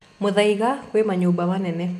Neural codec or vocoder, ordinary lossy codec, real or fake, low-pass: none; none; real; 14.4 kHz